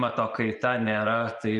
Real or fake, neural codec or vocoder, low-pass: real; none; 10.8 kHz